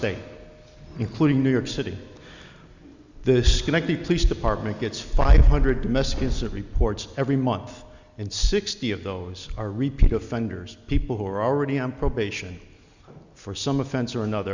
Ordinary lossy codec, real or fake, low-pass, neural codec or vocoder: Opus, 64 kbps; real; 7.2 kHz; none